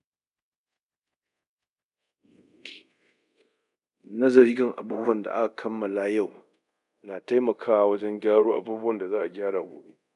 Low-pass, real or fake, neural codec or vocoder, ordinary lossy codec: 10.8 kHz; fake; codec, 24 kHz, 0.5 kbps, DualCodec; none